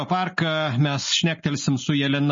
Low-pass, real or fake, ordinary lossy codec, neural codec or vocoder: 7.2 kHz; real; MP3, 32 kbps; none